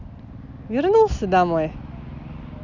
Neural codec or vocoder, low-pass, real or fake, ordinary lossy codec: none; 7.2 kHz; real; none